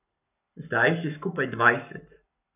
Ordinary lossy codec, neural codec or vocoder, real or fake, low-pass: none; codec, 44.1 kHz, 7.8 kbps, Pupu-Codec; fake; 3.6 kHz